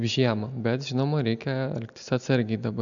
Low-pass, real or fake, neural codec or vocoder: 7.2 kHz; real; none